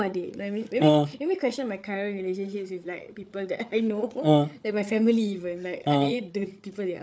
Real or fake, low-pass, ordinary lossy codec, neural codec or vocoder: fake; none; none; codec, 16 kHz, 8 kbps, FreqCodec, larger model